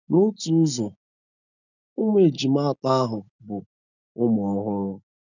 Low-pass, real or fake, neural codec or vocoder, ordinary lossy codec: 7.2 kHz; real; none; none